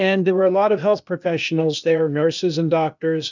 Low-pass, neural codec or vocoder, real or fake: 7.2 kHz; codec, 16 kHz, 0.8 kbps, ZipCodec; fake